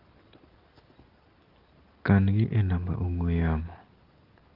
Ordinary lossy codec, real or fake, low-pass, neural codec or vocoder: Opus, 16 kbps; real; 5.4 kHz; none